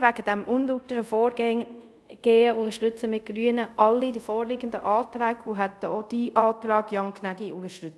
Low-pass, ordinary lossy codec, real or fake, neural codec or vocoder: none; none; fake; codec, 24 kHz, 0.5 kbps, DualCodec